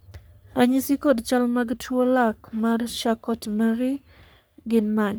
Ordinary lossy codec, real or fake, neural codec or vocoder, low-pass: none; fake; codec, 44.1 kHz, 3.4 kbps, Pupu-Codec; none